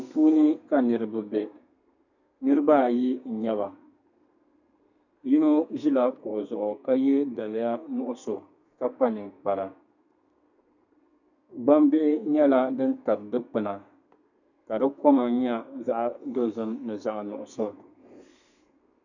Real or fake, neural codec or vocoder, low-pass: fake; codec, 32 kHz, 1.9 kbps, SNAC; 7.2 kHz